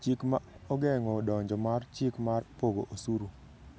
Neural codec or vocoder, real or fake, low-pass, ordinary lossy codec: none; real; none; none